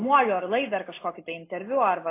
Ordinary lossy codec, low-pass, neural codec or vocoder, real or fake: AAC, 24 kbps; 3.6 kHz; none; real